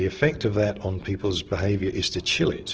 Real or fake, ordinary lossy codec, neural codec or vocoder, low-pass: real; Opus, 16 kbps; none; 7.2 kHz